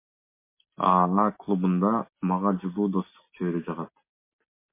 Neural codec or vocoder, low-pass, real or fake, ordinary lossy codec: none; 3.6 kHz; real; MP3, 24 kbps